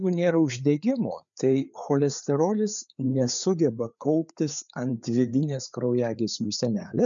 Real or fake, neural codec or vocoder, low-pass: fake; codec, 16 kHz, 4 kbps, FreqCodec, larger model; 7.2 kHz